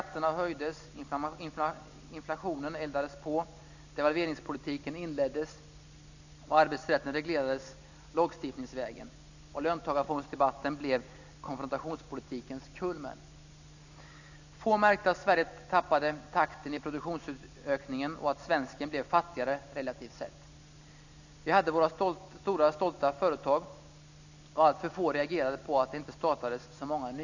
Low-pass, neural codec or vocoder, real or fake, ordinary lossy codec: 7.2 kHz; none; real; none